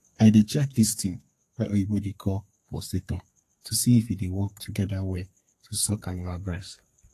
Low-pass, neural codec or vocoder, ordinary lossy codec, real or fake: 14.4 kHz; codec, 32 kHz, 1.9 kbps, SNAC; AAC, 48 kbps; fake